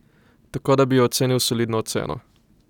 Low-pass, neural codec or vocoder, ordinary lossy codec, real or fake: 19.8 kHz; none; none; real